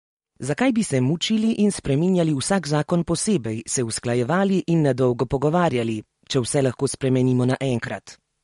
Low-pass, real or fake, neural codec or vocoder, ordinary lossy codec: 19.8 kHz; real; none; MP3, 48 kbps